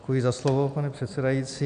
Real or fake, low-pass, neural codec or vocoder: real; 9.9 kHz; none